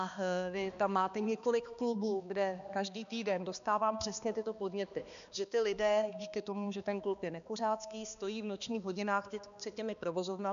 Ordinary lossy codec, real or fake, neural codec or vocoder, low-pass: MP3, 96 kbps; fake; codec, 16 kHz, 2 kbps, X-Codec, HuBERT features, trained on balanced general audio; 7.2 kHz